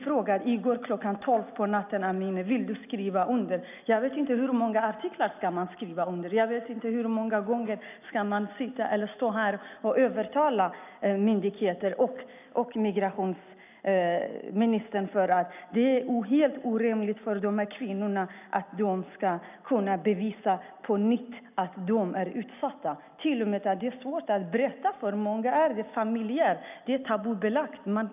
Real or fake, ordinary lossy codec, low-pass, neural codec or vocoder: real; none; 3.6 kHz; none